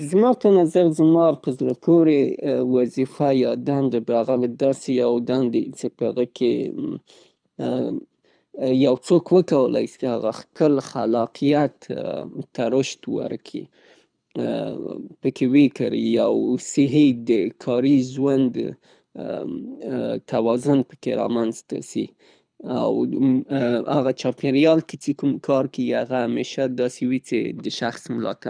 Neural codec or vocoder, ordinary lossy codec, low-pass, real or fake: codec, 24 kHz, 6 kbps, HILCodec; none; 9.9 kHz; fake